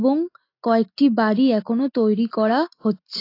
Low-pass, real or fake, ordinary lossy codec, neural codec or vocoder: 5.4 kHz; real; AAC, 32 kbps; none